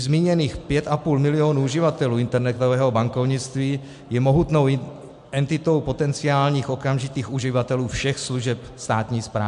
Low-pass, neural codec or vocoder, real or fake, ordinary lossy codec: 10.8 kHz; none; real; MP3, 64 kbps